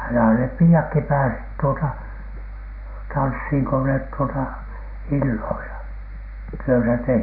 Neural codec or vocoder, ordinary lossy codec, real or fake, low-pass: none; none; real; 5.4 kHz